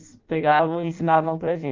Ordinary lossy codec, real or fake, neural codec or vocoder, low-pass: Opus, 32 kbps; fake; codec, 16 kHz in and 24 kHz out, 0.6 kbps, FireRedTTS-2 codec; 7.2 kHz